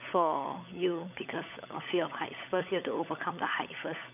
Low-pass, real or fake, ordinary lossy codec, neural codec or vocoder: 3.6 kHz; fake; none; codec, 16 kHz, 16 kbps, FunCodec, trained on LibriTTS, 50 frames a second